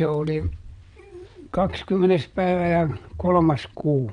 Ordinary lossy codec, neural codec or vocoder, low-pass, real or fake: none; vocoder, 22.05 kHz, 80 mel bands, WaveNeXt; 9.9 kHz; fake